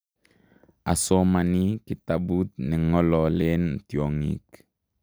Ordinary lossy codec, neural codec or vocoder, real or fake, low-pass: none; none; real; none